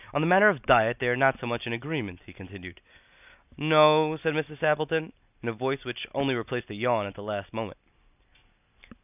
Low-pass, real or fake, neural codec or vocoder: 3.6 kHz; real; none